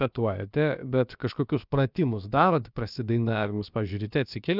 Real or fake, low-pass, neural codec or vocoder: fake; 5.4 kHz; codec, 16 kHz, about 1 kbps, DyCAST, with the encoder's durations